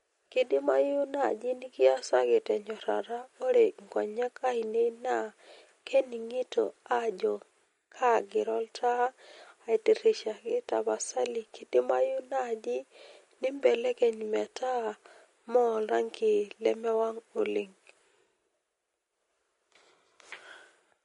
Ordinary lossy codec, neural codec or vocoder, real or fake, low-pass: MP3, 48 kbps; none; real; 19.8 kHz